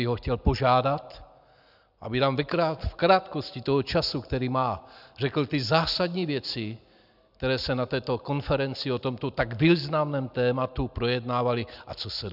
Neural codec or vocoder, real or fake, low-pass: none; real; 5.4 kHz